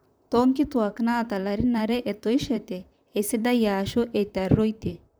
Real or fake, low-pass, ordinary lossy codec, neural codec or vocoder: fake; none; none; vocoder, 44.1 kHz, 128 mel bands, Pupu-Vocoder